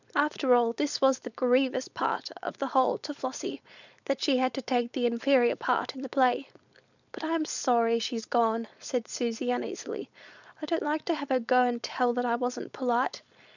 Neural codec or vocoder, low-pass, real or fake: codec, 16 kHz, 4.8 kbps, FACodec; 7.2 kHz; fake